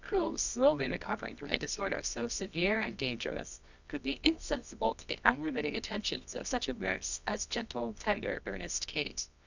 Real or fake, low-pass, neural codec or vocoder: fake; 7.2 kHz; codec, 24 kHz, 0.9 kbps, WavTokenizer, medium music audio release